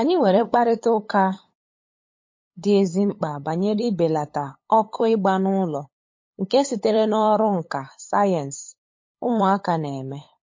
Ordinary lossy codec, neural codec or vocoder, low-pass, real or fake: MP3, 32 kbps; codec, 16 kHz, 8 kbps, FunCodec, trained on LibriTTS, 25 frames a second; 7.2 kHz; fake